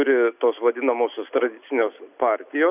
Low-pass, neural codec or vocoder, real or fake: 3.6 kHz; none; real